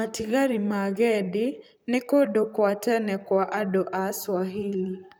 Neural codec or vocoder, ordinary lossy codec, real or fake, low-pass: vocoder, 44.1 kHz, 128 mel bands, Pupu-Vocoder; none; fake; none